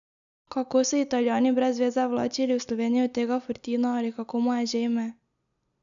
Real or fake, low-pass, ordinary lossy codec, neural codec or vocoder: real; 7.2 kHz; none; none